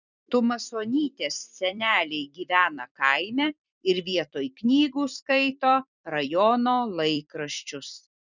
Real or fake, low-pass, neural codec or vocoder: fake; 7.2 kHz; vocoder, 44.1 kHz, 128 mel bands every 256 samples, BigVGAN v2